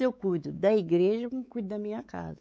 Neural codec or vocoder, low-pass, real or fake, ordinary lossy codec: codec, 16 kHz, 4 kbps, X-Codec, WavLM features, trained on Multilingual LibriSpeech; none; fake; none